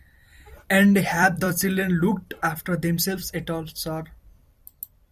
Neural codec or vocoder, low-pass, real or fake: vocoder, 44.1 kHz, 128 mel bands every 256 samples, BigVGAN v2; 14.4 kHz; fake